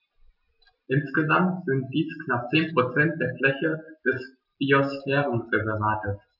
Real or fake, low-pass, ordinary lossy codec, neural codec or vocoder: real; 5.4 kHz; none; none